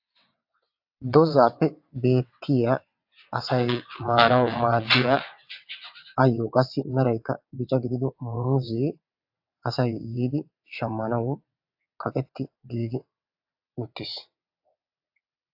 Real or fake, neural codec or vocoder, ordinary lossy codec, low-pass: fake; vocoder, 22.05 kHz, 80 mel bands, WaveNeXt; AAC, 48 kbps; 5.4 kHz